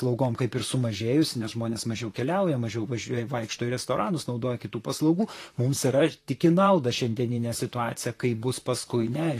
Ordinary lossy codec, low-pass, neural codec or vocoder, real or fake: AAC, 48 kbps; 14.4 kHz; vocoder, 44.1 kHz, 128 mel bands, Pupu-Vocoder; fake